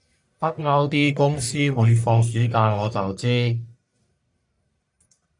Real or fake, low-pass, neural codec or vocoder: fake; 10.8 kHz; codec, 44.1 kHz, 1.7 kbps, Pupu-Codec